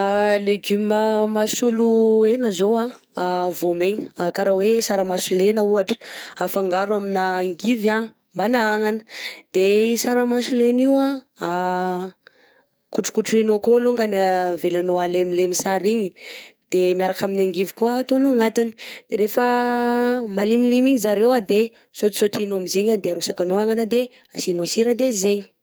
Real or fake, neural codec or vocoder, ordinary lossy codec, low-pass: fake; codec, 44.1 kHz, 2.6 kbps, SNAC; none; none